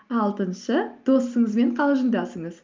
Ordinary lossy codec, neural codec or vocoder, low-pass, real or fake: Opus, 24 kbps; none; 7.2 kHz; real